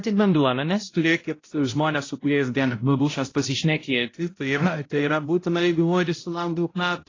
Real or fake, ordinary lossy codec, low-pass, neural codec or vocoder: fake; AAC, 32 kbps; 7.2 kHz; codec, 16 kHz, 0.5 kbps, X-Codec, HuBERT features, trained on balanced general audio